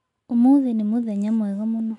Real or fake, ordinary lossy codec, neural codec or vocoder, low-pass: real; none; none; 10.8 kHz